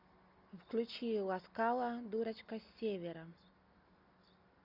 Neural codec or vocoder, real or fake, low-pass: none; real; 5.4 kHz